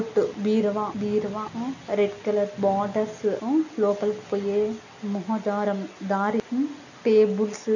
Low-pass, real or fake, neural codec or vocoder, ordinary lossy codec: 7.2 kHz; real; none; none